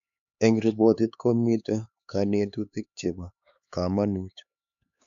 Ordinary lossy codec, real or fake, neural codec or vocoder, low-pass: none; fake; codec, 16 kHz, 4 kbps, X-Codec, HuBERT features, trained on LibriSpeech; 7.2 kHz